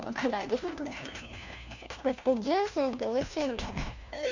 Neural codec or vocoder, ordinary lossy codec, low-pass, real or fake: codec, 16 kHz, 1 kbps, FunCodec, trained on LibriTTS, 50 frames a second; none; 7.2 kHz; fake